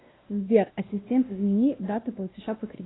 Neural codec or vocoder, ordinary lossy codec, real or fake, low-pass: codec, 16 kHz, 1 kbps, X-Codec, WavLM features, trained on Multilingual LibriSpeech; AAC, 16 kbps; fake; 7.2 kHz